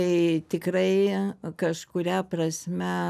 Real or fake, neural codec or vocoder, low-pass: real; none; 14.4 kHz